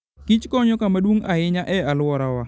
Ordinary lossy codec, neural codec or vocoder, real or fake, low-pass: none; none; real; none